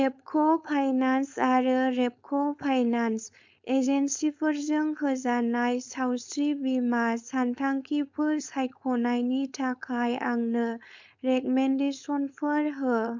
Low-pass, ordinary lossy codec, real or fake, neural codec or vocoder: 7.2 kHz; MP3, 64 kbps; fake; codec, 16 kHz, 4.8 kbps, FACodec